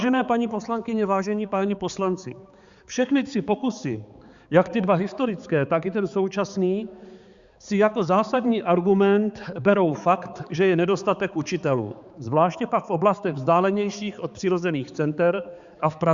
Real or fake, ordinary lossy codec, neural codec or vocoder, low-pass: fake; Opus, 64 kbps; codec, 16 kHz, 4 kbps, X-Codec, HuBERT features, trained on balanced general audio; 7.2 kHz